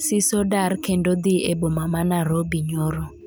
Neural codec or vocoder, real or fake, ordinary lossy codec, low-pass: none; real; none; none